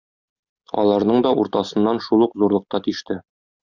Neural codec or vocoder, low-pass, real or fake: none; 7.2 kHz; real